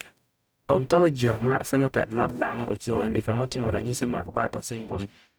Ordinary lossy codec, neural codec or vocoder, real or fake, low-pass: none; codec, 44.1 kHz, 0.9 kbps, DAC; fake; none